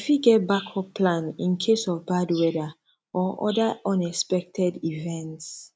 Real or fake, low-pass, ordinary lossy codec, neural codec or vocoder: real; none; none; none